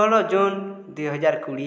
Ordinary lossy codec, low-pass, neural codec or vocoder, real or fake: none; none; none; real